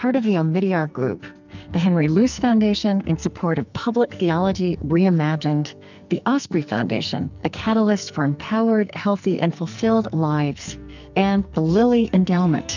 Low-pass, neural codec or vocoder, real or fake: 7.2 kHz; codec, 44.1 kHz, 2.6 kbps, SNAC; fake